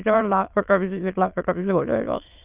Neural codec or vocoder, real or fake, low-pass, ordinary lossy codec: autoencoder, 22.05 kHz, a latent of 192 numbers a frame, VITS, trained on many speakers; fake; 3.6 kHz; Opus, 32 kbps